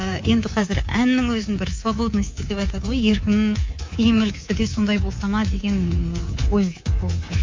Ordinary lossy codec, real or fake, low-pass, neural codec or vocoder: MP3, 48 kbps; fake; 7.2 kHz; codec, 24 kHz, 3.1 kbps, DualCodec